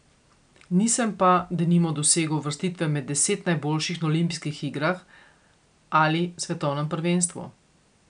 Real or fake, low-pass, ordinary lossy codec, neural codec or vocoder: real; 9.9 kHz; none; none